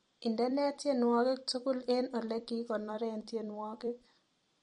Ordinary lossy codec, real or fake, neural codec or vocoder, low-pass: MP3, 48 kbps; fake; vocoder, 44.1 kHz, 128 mel bands every 256 samples, BigVGAN v2; 19.8 kHz